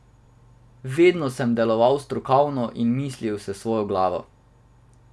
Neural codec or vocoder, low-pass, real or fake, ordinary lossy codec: none; none; real; none